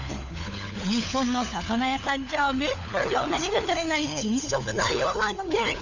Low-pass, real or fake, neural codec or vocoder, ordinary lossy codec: 7.2 kHz; fake; codec, 16 kHz, 4 kbps, FunCodec, trained on LibriTTS, 50 frames a second; none